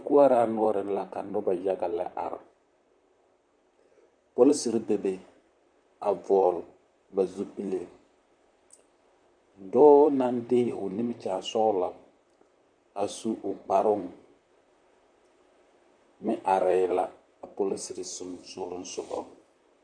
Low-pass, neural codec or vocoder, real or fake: 9.9 kHz; vocoder, 44.1 kHz, 128 mel bands, Pupu-Vocoder; fake